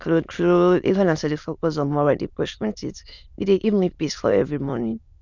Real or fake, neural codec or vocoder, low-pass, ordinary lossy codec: fake; autoencoder, 22.05 kHz, a latent of 192 numbers a frame, VITS, trained on many speakers; 7.2 kHz; none